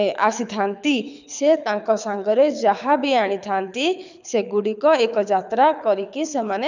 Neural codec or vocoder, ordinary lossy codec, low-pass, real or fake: codec, 24 kHz, 6 kbps, HILCodec; none; 7.2 kHz; fake